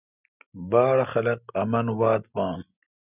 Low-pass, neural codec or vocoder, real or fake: 3.6 kHz; none; real